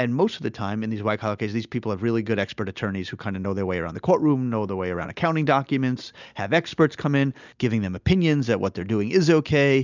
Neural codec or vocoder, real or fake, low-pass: none; real; 7.2 kHz